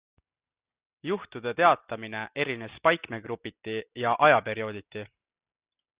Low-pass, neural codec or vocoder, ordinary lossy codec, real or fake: 3.6 kHz; none; Opus, 64 kbps; real